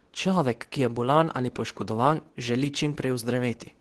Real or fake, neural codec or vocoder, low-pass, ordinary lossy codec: fake; codec, 24 kHz, 0.9 kbps, WavTokenizer, medium speech release version 2; 10.8 kHz; Opus, 16 kbps